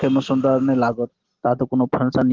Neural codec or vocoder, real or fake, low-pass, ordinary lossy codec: none; real; 7.2 kHz; Opus, 16 kbps